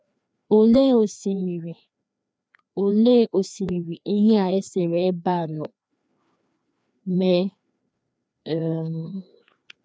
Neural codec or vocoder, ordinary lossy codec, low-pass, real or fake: codec, 16 kHz, 2 kbps, FreqCodec, larger model; none; none; fake